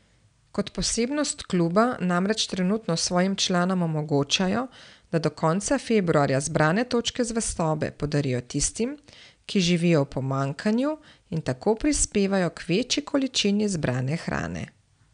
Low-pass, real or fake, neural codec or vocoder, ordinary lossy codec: 9.9 kHz; real; none; none